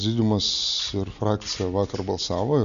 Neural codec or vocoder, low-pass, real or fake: none; 7.2 kHz; real